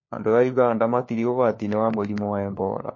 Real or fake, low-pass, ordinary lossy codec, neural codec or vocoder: fake; 7.2 kHz; MP3, 32 kbps; codec, 16 kHz, 4 kbps, FunCodec, trained on LibriTTS, 50 frames a second